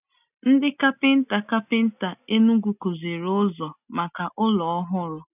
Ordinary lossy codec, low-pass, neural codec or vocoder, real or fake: none; 3.6 kHz; none; real